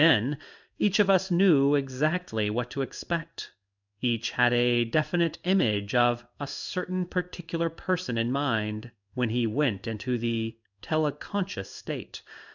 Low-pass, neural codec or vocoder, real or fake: 7.2 kHz; codec, 16 kHz in and 24 kHz out, 1 kbps, XY-Tokenizer; fake